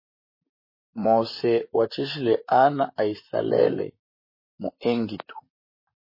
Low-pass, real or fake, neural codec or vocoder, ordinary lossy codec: 5.4 kHz; fake; vocoder, 44.1 kHz, 128 mel bands, Pupu-Vocoder; MP3, 24 kbps